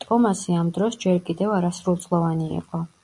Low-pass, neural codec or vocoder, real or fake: 10.8 kHz; none; real